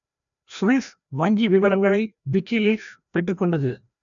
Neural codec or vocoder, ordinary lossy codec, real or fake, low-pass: codec, 16 kHz, 1 kbps, FreqCodec, larger model; none; fake; 7.2 kHz